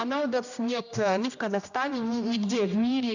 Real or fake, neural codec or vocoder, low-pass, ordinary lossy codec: fake; codec, 16 kHz, 1 kbps, X-Codec, HuBERT features, trained on general audio; 7.2 kHz; none